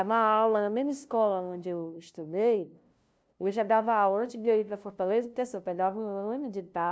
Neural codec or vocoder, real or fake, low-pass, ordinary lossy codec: codec, 16 kHz, 0.5 kbps, FunCodec, trained on LibriTTS, 25 frames a second; fake; none; none